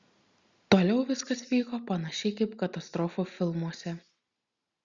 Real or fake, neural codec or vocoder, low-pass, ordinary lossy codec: real; none; 7.2 kHz; Opus, 64 kbps